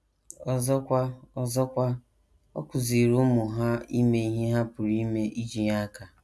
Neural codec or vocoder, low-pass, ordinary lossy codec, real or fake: none; none; none; real